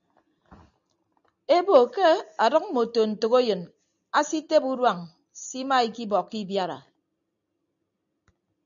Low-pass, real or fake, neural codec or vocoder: 7.2 kHz; real; none